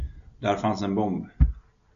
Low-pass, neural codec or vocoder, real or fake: 7.2 kHz; none; real